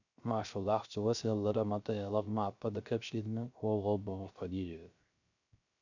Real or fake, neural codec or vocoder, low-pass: fake; codec, 16 kHz, 0.3 kbps, FocalCodec; 7.2 kHz